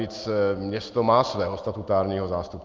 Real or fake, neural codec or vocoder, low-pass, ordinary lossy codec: real; none; 7.2 kHz; Opus, 32 kbps